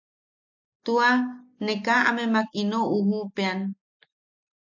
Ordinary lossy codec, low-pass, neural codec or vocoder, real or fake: AAC, 48 kbps; 7.2 kHz; none; real